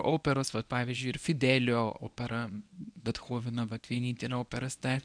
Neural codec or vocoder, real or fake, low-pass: codec, 24 kHz, 0.9 kbps, WavTokenizer, medium speech release version 1; fake; 9.9 kHz